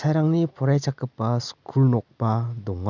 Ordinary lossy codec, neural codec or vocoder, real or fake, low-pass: none; none; real; 7.2 kHz